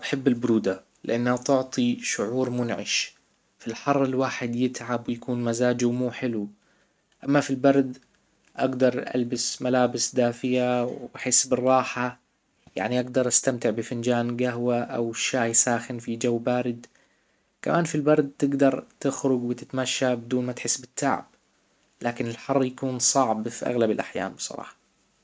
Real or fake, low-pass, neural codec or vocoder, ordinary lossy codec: real; none; none; none